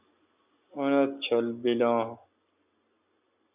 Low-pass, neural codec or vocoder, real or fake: 3.6 kHz; none; real